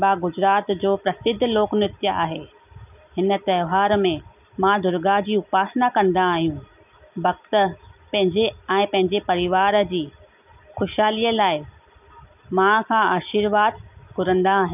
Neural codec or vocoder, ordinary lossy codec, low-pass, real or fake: none; none; 3.6 kHz; real